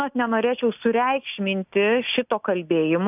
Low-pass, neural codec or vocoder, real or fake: 3.6 kHz; none; real